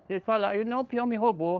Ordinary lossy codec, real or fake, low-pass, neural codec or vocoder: Opus, 32 kbps; fake; 7.2 kHz; codec, 16 kHz, 4 kbps, FunCodec, trained on LibriTTS, 50 frames a second